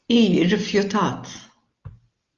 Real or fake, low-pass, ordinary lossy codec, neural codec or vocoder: real; 7.2 kHz; Opus, 32 kbps; none